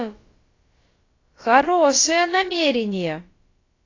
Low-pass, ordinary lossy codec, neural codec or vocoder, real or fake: 7.2 kHz; AAC, 32 kbps; codec, 16 kHz, about 1 kbps, DyCAST, with the encoder's durations; fake